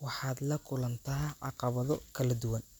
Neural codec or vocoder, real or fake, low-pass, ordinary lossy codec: none; real; none; none